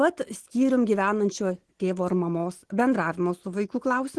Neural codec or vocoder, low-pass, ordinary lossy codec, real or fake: none; 10.8 kHz; Opus, 16 kbps; real